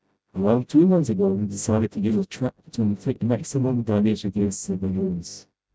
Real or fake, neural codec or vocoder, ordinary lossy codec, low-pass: fake; codec, 16 kHz, 0.5 kbps, FreqCodec, smaller model; none; none